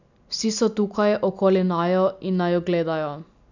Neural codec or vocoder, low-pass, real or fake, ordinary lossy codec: none; 7.2 kHz; real; none